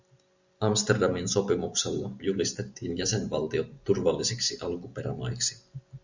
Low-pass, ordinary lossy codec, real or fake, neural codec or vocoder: 7.2 kHz; Opus, 64 kbps; real; none